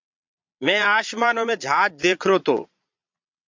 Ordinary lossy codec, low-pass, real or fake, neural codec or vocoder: MP3, 64 kbps; 7.2 kHz; fake; vocoder, 22.05 kHz, 80 mel bands, Vocos